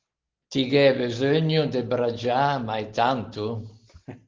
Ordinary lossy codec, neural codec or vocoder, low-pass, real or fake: Opus, 16 kbps; none; 7.2 kHz; real